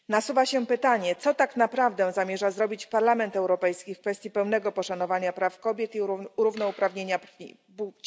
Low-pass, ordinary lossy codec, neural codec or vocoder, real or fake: none; none; none; real